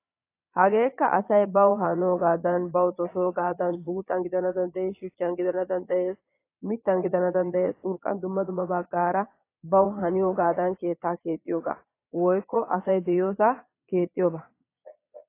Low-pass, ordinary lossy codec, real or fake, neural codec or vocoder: 3.6 kHz; AAC, 24 kbps; fake; vocoder, 22.05 kHz, 80 mel bands, Vocos